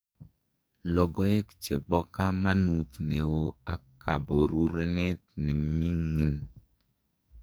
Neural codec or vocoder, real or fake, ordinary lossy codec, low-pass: codec, 44.1 kHz, 2.6 kbps, SNAC; fake; none; none